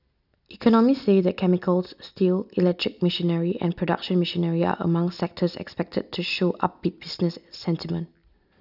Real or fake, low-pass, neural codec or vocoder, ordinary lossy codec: real; 5.4 kHz; none; none